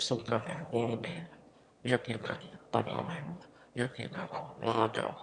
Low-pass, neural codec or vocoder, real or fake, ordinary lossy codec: 9.9 kHz; autoencoder, 22.05 kHz, a latent of 192 numbers a frame, VITS, trained on one speaker; fake; Opus, 64 kbps